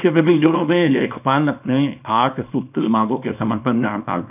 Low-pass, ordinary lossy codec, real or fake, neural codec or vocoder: 3.6 kHz; none; fake; codec, 24 kHz, 0.9 kbps, WavTokenizer, small release